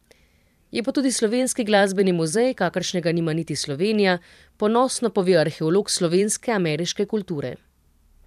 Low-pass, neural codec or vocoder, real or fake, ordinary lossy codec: 14.4 kHz; vocoder, 44.1 kHz, 128 mel bands every 512 samples, BigVGAN v2; fake; none